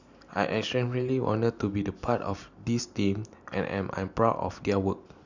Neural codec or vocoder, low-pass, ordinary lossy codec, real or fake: none; 7.2 kHz; none; real